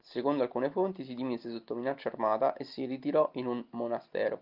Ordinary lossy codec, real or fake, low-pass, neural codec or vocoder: Opus, 24 kbps; real; 5.4 kHz; none